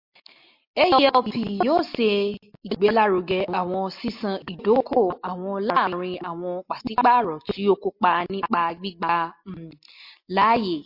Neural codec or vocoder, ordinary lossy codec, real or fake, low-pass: none; MP3, 32 kbps; real; 5.4 kHz